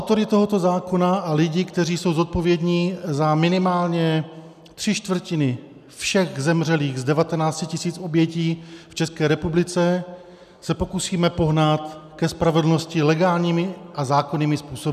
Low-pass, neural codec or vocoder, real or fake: 14.4 kHz; none; real